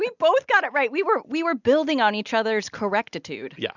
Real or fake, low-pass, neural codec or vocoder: real; 7.2 kHz; none